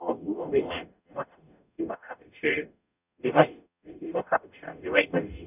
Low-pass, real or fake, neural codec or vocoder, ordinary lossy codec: 3.6 kHz; fake; codec, 44.1 kHz, 0.9 kbps, DAC; none